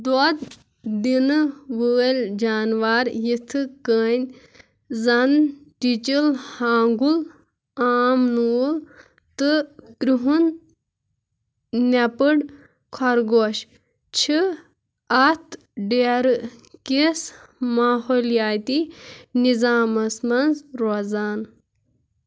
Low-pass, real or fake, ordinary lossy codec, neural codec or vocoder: none; real; none; none